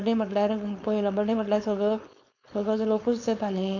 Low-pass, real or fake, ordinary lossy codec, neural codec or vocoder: 7.2 kHz; fake; none; codec, 16 kHz, 4.8 kbps, FACodec